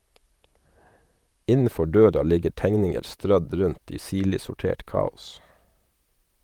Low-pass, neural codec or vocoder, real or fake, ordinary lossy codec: 19.8 kHz; vocoder, 44.1 kHz, 128 mel bands, Pupu-Vocoder; fake; Opus, 32 kbps